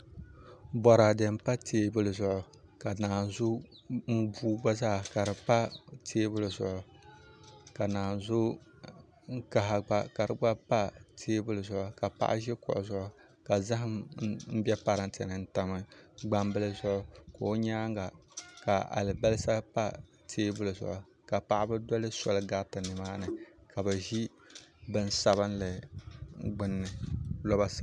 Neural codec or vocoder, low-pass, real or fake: none; 9.9 kHz; real